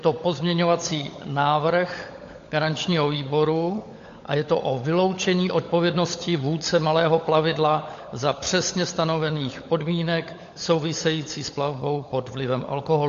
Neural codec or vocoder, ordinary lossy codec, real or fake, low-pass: codec, 16 kHz, 16 kbps, FunCodec, trained on LibriTTS, 50 frames a second; AAC, 48 kbps; fake; 7.2 kHz